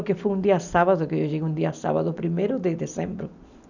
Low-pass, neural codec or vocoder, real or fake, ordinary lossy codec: 7.2 kHz; none; real; none